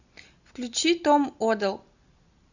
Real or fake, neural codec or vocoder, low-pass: real; none; 7.2 kHz